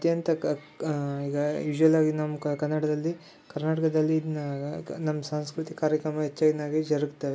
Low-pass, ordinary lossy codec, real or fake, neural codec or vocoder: none; none; real; none